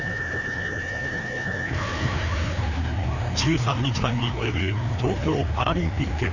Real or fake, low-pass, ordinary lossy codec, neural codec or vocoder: fake; 7.2 kHz; none; codec, 16 kHz, 2 kbps, FreqCodec, larger model